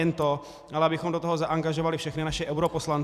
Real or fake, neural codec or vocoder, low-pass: fake; vocoder, 44.1 kHz, 128 mel bands every 256 samples, BigVGAN v2; 14.4 kHz